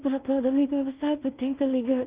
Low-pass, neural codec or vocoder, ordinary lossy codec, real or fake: 3.6 kHz; codec, 16 kHz in and 24 kHz out, 0.4 kbps, LongCat-Audio-Codec, two codebook decoder; Opus, 24 kbps; fake